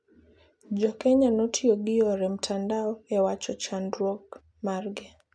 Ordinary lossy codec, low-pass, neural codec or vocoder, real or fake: none; none; none; real